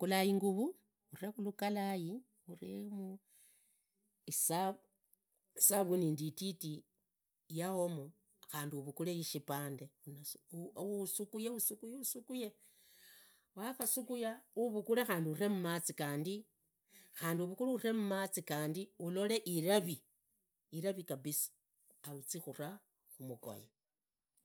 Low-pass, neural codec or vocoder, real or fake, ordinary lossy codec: none; none; real; none